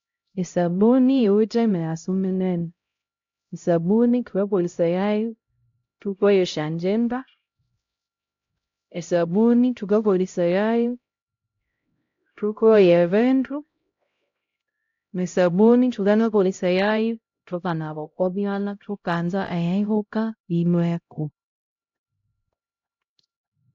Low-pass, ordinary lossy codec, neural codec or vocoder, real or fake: 7.2 kHz; AAC, 48 kbps; codec, 16 kHz, 0.5 kbps, X-Codec, HuBERT features, trained on LibriSpeech; fake